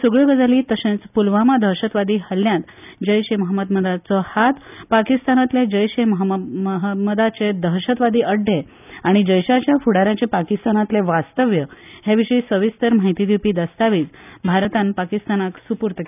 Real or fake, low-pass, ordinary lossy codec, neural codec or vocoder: real; 3.6 kHz; none; none